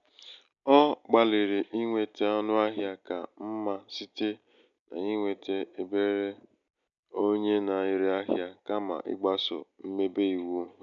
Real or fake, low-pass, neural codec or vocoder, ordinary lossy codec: real; 7.2 kHz; none; none